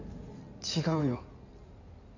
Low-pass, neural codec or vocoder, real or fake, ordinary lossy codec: 7.2 kHz; codec, 16 kHz in and 24 kHz out, 2.2 kbps, FireRedTTS-2 codec; fake; none